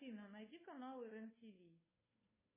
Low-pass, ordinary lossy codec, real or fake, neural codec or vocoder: 3.6 kHz; MP3, 16 kbps; fake; codec, 16 kHz, 2 kbps, FunCodec, trained on Chinese and English, 25 frames a second